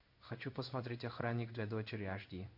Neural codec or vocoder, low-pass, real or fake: codec, 16 kHz in and 24 kHz out, 1 kbps, XY-Tokenizer; 5.4 kHz; fake